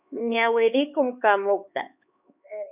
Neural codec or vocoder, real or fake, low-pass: codec, 16 kHz, 4 kbps, X-Codec, HuBERT features, trained on LibriSpeech; fake; 3.6 kHz